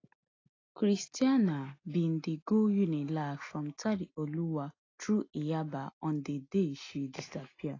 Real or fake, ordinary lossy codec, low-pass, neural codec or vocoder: real; AAC, 32 kbps; 7.2 kHz; none